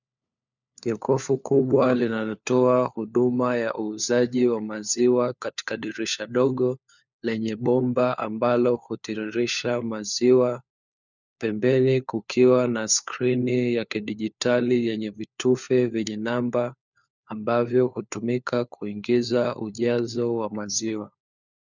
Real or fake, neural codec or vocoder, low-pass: fake; codec, 16 kHz, 4 kbps, FunCodec, trained on LibriTTS, 50 frames a second; 7.2 kHz